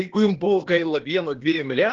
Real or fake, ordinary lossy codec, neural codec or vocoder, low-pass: fake; Opus, 16 kbps; codec, 16 kHz, 0.8 kbps, ZipCodec; 7.2 kHz